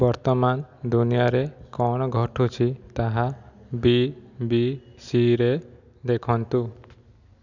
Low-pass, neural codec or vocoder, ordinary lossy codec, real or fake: 7.2 kHz; none; none; real